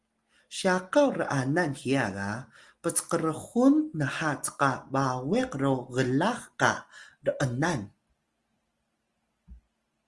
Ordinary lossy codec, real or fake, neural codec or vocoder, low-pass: Opus, 24 kbps; real; none; 10.8 kHz